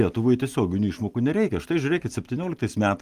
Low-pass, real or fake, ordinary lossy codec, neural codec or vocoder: 14.4 kHz; real; Opus, 24 kbps; none